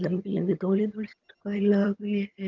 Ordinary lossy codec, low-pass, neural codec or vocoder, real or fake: Opus, 24 kbps; 7.2 kHz; vocoder, 22.05 kHz, 80 mel bands, HiFi-GAN; fake